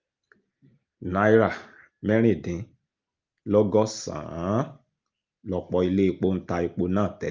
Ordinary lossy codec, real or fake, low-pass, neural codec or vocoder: Opus, 24 kbps; real; 7.2 kHz; none